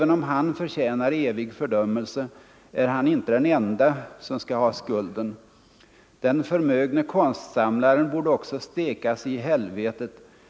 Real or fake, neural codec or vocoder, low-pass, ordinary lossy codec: real; none; none; none